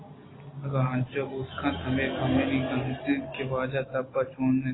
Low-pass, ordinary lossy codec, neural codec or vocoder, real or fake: 7.2 kHz; AAC, 16 kbps; none; real